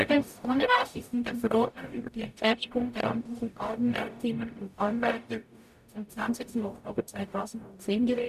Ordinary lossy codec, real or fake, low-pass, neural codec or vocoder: none; fake; 14.4 kHz; codec, 44.1 kHz, 0.9 kbps, DAC